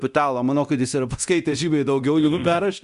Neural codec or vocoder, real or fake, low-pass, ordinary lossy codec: codec, 24 kHz, 0.9 kbps, DualCodec; fake; 10.8 kHz; Opus, 64 kbps